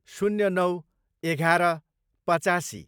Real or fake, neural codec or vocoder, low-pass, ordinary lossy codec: fake; vocoder, 44.1 kHz, 128 mel bands every 256 samples, BigVGAN v2; 19.8 kHz; none